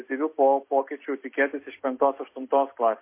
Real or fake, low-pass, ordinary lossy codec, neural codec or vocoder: real; 3.6 kHz; MP3, 24 kbps; none